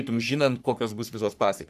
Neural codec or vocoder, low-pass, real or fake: codec, 44.1 kHz, 3.4 kbps, Pupu-Codec; 14.4 kHz; fake